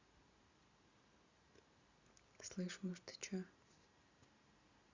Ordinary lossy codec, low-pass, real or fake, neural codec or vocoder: Opus, 64 kbps; 7.2 kHz; real; none